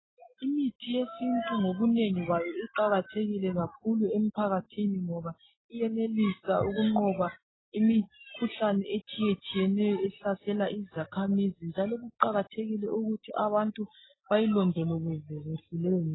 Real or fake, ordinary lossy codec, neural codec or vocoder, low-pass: real; AAC, 16 kbps; none; 7.2 kHz